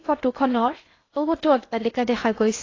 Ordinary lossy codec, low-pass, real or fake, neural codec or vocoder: AAC, 32 kbps; 7.2 kHz; fake; codec, 16 kHz in and 24 kHz out, 0.6 kbps, FocalCodec, streaming, 2048 codes